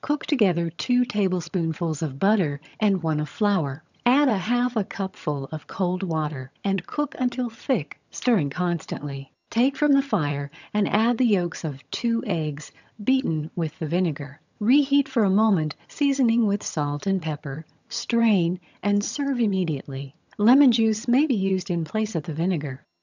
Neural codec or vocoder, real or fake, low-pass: vocoder, 22.05 kHz, 80 mel bands, HiFi-GAN; fake; 7.2 kHz